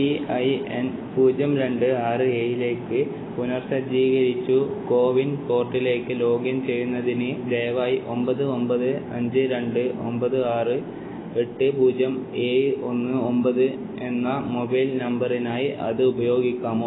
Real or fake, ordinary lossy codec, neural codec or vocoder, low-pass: real; AAC, 16 kbps; none; 7.2 kHz